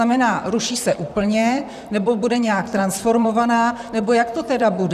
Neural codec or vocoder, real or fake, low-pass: vocoder, 44.1 kHz, 128 mel bands, Pupu-Vocoder; fake; 14.4 kHz